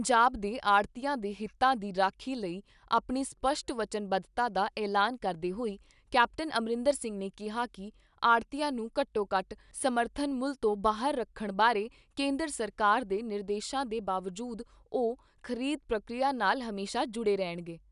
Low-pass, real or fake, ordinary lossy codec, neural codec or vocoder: 10.8 kHz; real; none; none